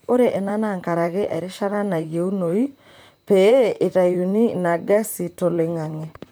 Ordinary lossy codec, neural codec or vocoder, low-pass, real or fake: none; vocoder, 44.1 kHz, 128 mel bands, Pupu-Vocoder; none; fake